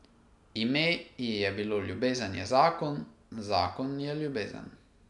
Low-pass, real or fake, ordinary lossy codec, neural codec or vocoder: 10.8 kHz; real; none; none